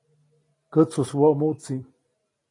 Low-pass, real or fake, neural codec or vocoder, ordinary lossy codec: 10.8 kHz; real; none; AAC, 32 kbps